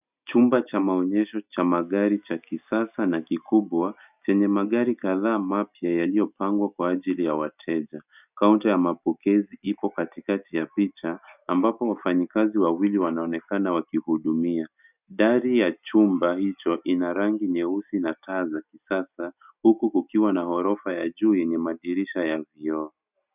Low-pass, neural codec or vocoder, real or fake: 3.6 kHz; none; real